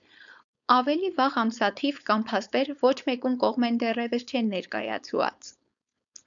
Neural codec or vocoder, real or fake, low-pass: codec, 16 kHz, 4.8 kbps, FACodec; fake; 7.2 kHz